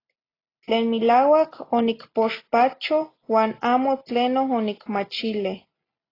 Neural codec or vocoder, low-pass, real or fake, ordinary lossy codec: none; 5.4 kHz; real; AAC, 24 kbps